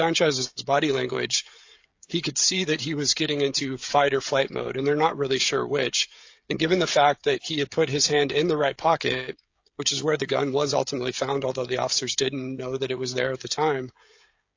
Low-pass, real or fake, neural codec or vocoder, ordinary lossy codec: 7.2 kHz; fake; codec, 16 kHz, 16 kbps, FreqCodec, smaller model; AAC, 48 kbps